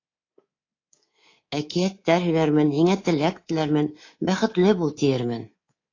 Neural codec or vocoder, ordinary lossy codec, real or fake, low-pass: codec, 24 kHz, 3.1 kbps, DualCodec; AAC, 32 kbps; fake; 7.2 kHz